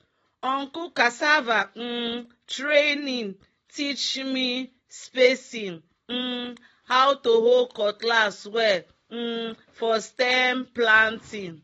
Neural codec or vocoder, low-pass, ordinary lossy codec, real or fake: none; 19.8 kHz; AAC, 24 kbps; real